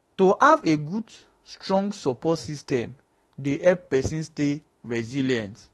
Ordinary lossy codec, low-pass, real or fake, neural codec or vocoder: AAC, 32 kbps; 19.8 kHz; fake; autoencoder, 48 kHz, 32 numbers a frame, DAC-VAE, trained on Japanese speech